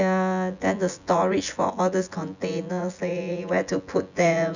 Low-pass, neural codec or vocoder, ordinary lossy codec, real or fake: 7.2 kHz; vocoder, 24 kHz, 100 mel bands, Vocos; none; fake